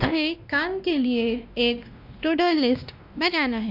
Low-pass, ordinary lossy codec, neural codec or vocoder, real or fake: 5.4 kHz; none; codec, 16 kHz, 1 kbps, X-Codec, WavLM features, trained on Multilingual LibriSpeech; fake